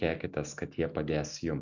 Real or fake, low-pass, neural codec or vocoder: real; 7.2 kHz; none